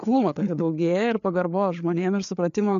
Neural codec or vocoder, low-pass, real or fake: codec, 16 kHz, 4 kbps, FreqCodec, larger model; 7.2 kHz; fake